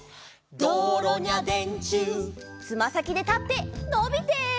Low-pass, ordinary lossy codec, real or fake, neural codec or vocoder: none; none; real; none